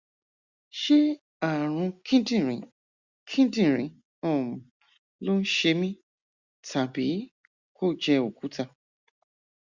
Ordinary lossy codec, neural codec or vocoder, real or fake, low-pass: Opus, 64 kbps; none; real; 7.2 kHz